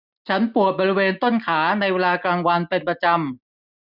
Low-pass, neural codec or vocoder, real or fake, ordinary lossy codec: 5.4 kHz; none; real; none